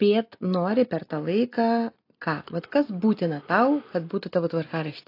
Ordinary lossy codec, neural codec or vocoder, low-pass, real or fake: AAC, 32 kbps; none; 5.4 kHz; real